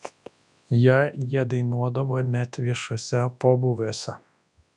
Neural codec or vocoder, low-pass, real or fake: codec, 24 kHz, 0.9 kbps, WavTokenizer, large speech release; 10.8 kHz; fake